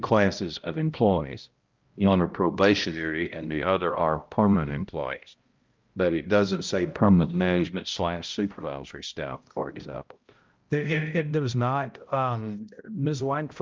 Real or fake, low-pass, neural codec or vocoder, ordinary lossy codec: fake; 7.2 kHz; codec, 16 kHz, 0.5 kbps, X-Codec, HuBERT features, trained on balanced general audio; Opus, 32 kbps